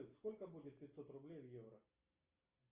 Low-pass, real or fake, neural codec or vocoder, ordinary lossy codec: 3.6 kHz; real; none; Opus, 24 kbps